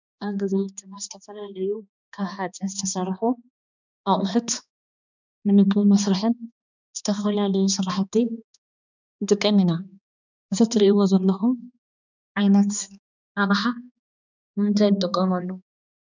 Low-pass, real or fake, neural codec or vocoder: 7.2 kHz; fake; codec, 16 kHz, 2 kbps, X-Codec, HuBERT features, trained on balanced general audio